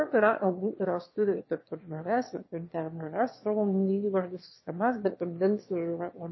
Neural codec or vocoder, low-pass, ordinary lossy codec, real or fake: autoencoder, 22.05 kHz, a latent of 192 numbers a frame, VITS, trained on one speaker; 7.2 kHz; MP3, 24 kbps; fake